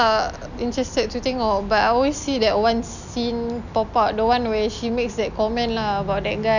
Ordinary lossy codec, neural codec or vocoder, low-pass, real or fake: none; none; 7.2 kHz; real